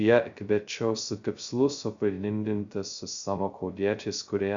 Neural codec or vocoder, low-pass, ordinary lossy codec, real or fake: codec, 16 kHz, 0.2 kbps, FocalCodec; 7.2 kHz; Opus, 64 kbps; fake